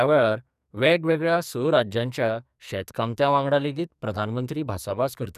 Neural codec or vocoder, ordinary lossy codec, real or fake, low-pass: codec, 44.1 kHz, 2.6 kbps, SNAC; none; fake; 14.4 kHz